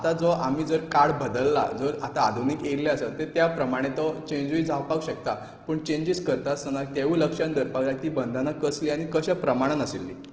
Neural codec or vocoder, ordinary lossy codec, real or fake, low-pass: none; Opus, 16 kbps; real; 7.2 kHz